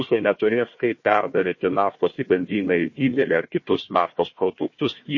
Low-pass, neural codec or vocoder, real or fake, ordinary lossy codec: 7.2 kHz; codec, 16 kHz, 1 kbps, FunCodec, trained on Chinese and English, 50 frames a second; fake; MP3, 32 kbps